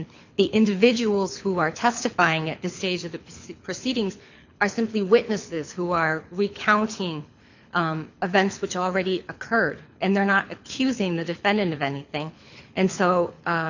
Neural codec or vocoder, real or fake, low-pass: codec, 24 kHz, 6 kbps, HILCodec; fake; 7.2 kHz